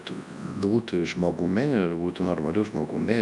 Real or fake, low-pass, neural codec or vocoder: fake; 10.8 kHz; codec, 24 kHz, 0.9 kbps, WavTokenizer, large speech release